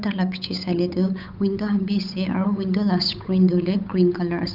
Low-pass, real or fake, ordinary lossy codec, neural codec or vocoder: 5.4 kHz; fake; none; codec, 16 kHz, 8 kbps, FunCodec, trained on Chinese and English, 25 frames a second